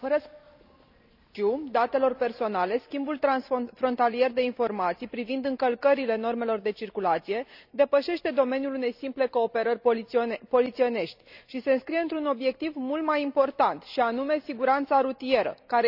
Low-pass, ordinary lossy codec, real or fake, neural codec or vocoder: 5.4 kHz; none; real; none